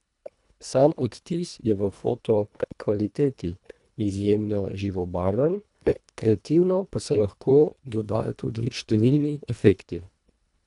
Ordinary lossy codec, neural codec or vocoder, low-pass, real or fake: none; codec, 24 kHz, 1.5 kbps, HILCodec; 10.8 kHz; fake